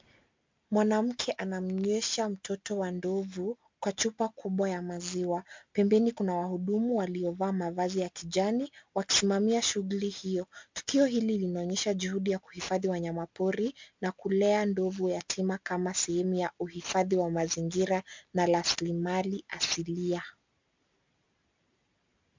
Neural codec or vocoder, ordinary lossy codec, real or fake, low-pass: none; MP3, 64 kbps; real; 7.2 kHz